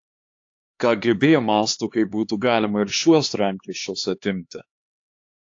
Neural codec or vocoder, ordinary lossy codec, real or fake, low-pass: codec, 16 kHz, 2 kbps, X-Codec, HuBERT features, trained on LibriSpeech; AAC, 48 kbps; fake; 7.2 kHz